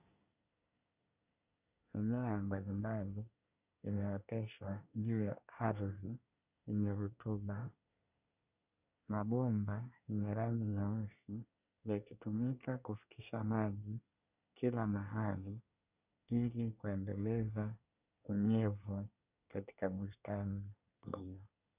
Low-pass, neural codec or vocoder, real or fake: 3.6 kHz; codec, 24 kHz, 1 kbps, SNAC; fake